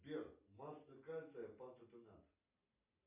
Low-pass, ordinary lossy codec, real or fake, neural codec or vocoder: 3.6 kHz; MP3, 32 kbps; real; none